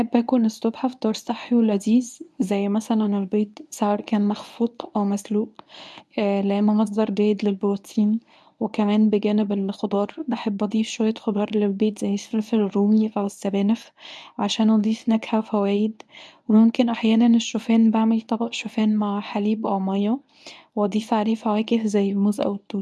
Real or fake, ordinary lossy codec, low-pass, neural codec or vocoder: fake; none; none; codec, 24 kHz, 0.9 kbps, WavTokenizer, medium speech release version 1